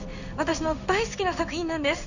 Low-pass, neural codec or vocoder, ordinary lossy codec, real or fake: 7.2 kHz; codec, 16 kHz in and 24 kHz out, 1 kbps, XY-Tokenizer; none; fake